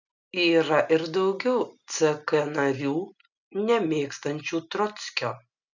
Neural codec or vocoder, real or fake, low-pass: none; real; 7.2 kHz